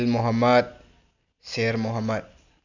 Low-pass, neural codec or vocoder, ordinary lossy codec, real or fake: 7.2 kHz; none; none; real